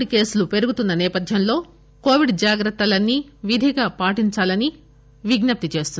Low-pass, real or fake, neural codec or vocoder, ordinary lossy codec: none; real; none; none